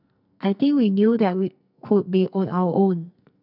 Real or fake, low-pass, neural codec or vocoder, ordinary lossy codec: fake; 5.4 kHz; codec, 44.1 kHz, 2.6 kbps, SNAC; none